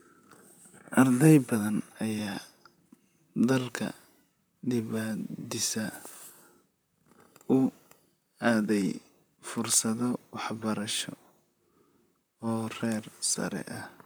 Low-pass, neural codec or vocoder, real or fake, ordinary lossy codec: none; vocoder, 44.1 kHz, 128 mel bands, Pupu-Vocoder; fake; none